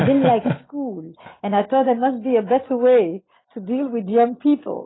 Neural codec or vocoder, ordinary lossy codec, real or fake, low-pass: none; AAC, 16 kbps; real; 7.2 kHz